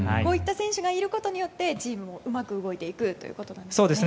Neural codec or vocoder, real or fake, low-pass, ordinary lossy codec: none; real; none; none